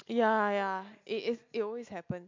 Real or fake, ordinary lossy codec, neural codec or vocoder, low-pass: real; MP3, 48 kbps; none; 7.2 kHz